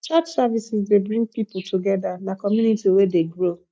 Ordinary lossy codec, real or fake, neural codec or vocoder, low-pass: none; real; none; none